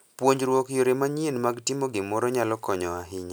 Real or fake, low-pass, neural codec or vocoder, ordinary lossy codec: real; none; none; none